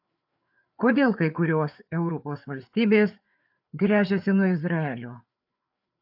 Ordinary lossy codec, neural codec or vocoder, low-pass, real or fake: AAC, 48 kbps; codec, 16 kHz, 4 kbps, FreqCodec, larger model; 5.4 kHz; fake